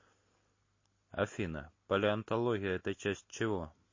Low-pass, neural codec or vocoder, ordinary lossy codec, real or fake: 7.2 kHz; none; MP3, 32 kbps; real